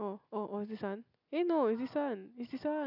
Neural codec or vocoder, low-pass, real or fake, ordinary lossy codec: none; 5.4 kHz; real; none